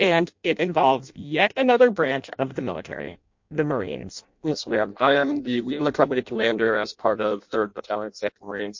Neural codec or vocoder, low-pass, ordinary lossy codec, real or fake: codec, 16 kHz in and 24 kHz out, 0.6 kbps, FireRedTTS-2 codec; 7.2 kHz; MP3, 48 kbps; fake